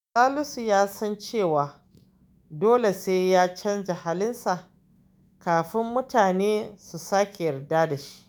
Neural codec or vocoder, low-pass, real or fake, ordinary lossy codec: autoencoder, 48 kHz, 128 numbers a frame, DAC-VAE, trained on Japanese speech; none; fake; none